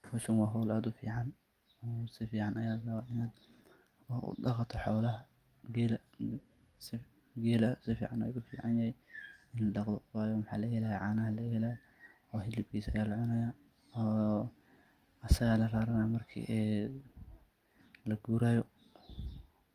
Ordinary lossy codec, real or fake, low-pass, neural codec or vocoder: Opus, 32 kbps; real; 14.4 kHz; none